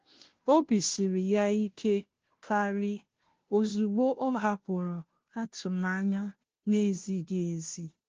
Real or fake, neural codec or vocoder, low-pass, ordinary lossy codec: fake; codec, 16 kHz, 0.5 kbps, FunCodec, trained on Chinese and English, 25 frames a second; 7.2 kHz; Opus, 16 kbps